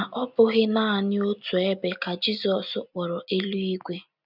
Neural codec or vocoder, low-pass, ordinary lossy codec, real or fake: none; 5.4 kHz; none; real